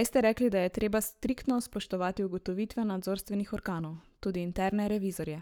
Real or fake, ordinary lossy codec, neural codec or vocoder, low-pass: fake; none; codec, 44.1 kHz, 7.8 kbps, Pupu-Codec; none